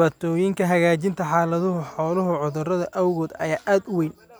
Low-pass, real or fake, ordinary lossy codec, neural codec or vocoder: none; real; none; none